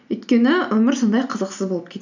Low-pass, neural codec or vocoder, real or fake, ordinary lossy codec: 7.2 kHz; none; real; none